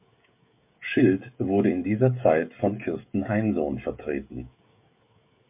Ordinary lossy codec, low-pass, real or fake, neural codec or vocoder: MP3, 32 kbps; 3.6 kHz; fake; codec, 16 kHz, 16 kbps, FreqCodec, smaller model